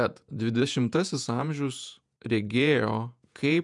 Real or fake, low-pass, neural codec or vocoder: fake; 10.8 kHz; vocoder, 48 kHz, 128 mel bands, Vocos